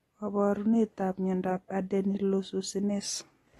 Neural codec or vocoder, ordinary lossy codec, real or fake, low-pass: none; AAC, 32 kbps; real; 19.8 kHz